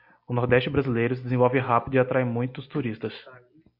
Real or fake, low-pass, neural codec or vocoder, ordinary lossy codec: real; 5.4 kHz; none; Opus, 64 kbps